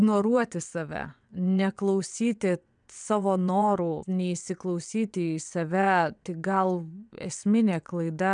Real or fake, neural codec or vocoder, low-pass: fake; vocoder, 22.05 kHz, 80 mel bands, WaveNeXt; 9.9 kHz